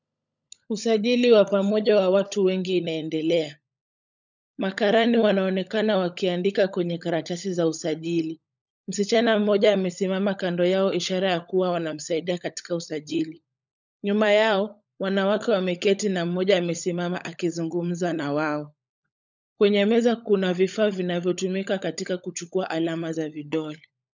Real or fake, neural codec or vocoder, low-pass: fake; codec, 16 kHz, 16 kbps, FunCodec, trained on LibriTTS, 50 frames a second; 7.2 kHz